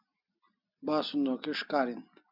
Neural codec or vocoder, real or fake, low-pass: none; real; 5.4 kHz